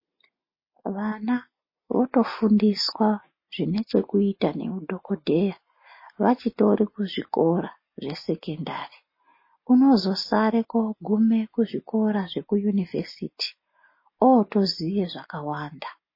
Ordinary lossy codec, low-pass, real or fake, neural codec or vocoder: MP3, 24 kbps; 5.4 kHz; real; none